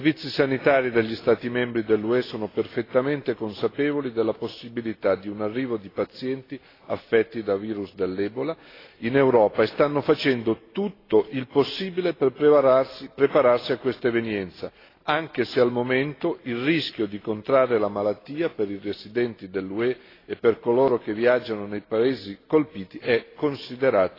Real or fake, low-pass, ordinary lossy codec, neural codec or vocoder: real; 5.4 kHz; AAC, 24 kbps; none